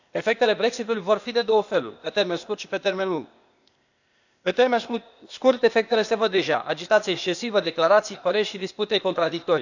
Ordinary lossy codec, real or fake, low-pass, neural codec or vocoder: none; fake; 7.2 kHz; codec, 16 kHz, 0.8 kbps, ZipCodec